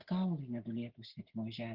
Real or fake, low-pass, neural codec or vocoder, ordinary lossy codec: real; 5.4 kHz; none; Opus, 16 kbps